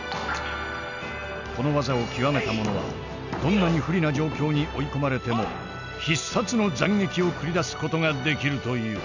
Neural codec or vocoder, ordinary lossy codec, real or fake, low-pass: none; none; real; 7.2 kHz